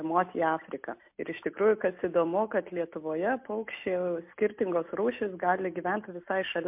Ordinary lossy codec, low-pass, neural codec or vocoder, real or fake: MP3, 32 kbps; 3.6 kHz; none; real